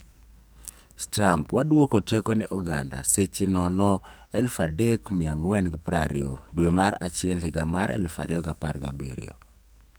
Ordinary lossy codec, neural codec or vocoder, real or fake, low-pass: none; codec, 44.1 kHz, 2.6 kbps, SNAC; fake; none